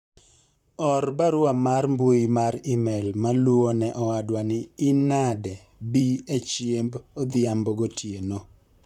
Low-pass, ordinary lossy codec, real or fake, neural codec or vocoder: 19.8 kHz; none; fake; vocoder, 44.1 kHz, 128 mel bands, Pupu-Vocoder